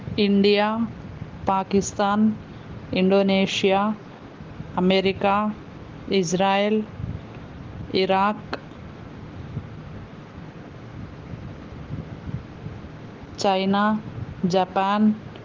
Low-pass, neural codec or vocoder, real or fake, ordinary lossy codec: 7.2 kHz; none; real; Opus, 16 kbps